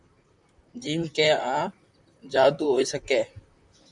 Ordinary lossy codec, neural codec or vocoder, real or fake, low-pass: MP3, 96 kbps; vocoder, 44.1 kHz, 128 mel bands, Pupu-Vocoder; fake; 10.8 kHz